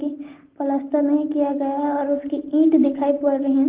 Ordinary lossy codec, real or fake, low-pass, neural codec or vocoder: Opus, 16 kbps; real; 3.6 kHz; none